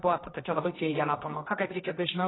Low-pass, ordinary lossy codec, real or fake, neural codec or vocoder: 7.2 kHz; AAC, 16 kbps; fake; codec, 24 kHz, 0.9 kbps, WavTokenizer, medium music audio release